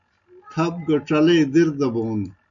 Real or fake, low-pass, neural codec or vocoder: real; 7.2 kHz; none